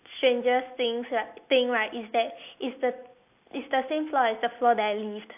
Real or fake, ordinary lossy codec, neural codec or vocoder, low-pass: real; AAC, 32 kbps; none; 3.6 kHz